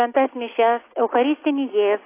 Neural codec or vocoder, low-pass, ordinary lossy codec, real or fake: none; 3.6 kHz; AAC, 24 kbps; real